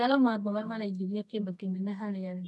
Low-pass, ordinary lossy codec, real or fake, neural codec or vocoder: none; none; fake; codec, 24 kHz, 0.9 kbps, WavTokenizer, medium music audio release